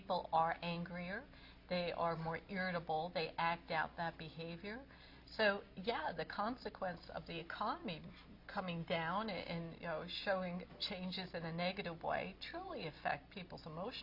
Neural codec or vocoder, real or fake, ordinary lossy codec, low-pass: none; real; MP3, 32 kbps; 5.4 kHz